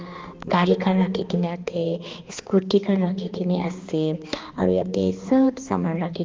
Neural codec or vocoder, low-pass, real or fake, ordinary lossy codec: codec, 16 kHz, 2 kbps, X-Codec, HuBERT features, trained on balanced general audio; 7.2 kHz; fake; Opus, 32 kbps